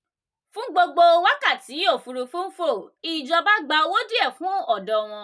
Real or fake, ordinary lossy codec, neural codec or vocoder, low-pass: real; none; none; none